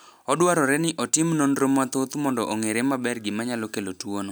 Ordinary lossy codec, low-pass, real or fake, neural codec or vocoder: none; none; real; none